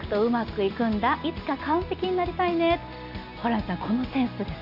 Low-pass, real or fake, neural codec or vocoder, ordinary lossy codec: 5.4 kHz; real; none; AAC, 48 kbps